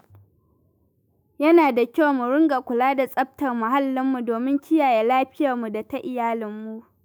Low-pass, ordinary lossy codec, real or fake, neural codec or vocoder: none; none; fake; autoencoder, 48 kHz, 128 numbers a frame, DAC-VAE, trained on Japanese speech